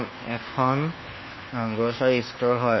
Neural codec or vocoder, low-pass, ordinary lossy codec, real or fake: codec, 24 kHz, 1.2 kbps, DualCodec; 7.2 kHz; MP3, 24 kbps; fake